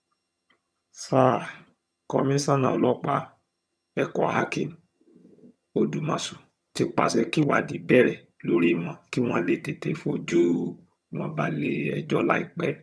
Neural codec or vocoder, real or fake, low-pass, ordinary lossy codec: vocoder, 22.05 kHz, 80 mel bands, HiFi-GAN; fake; none; none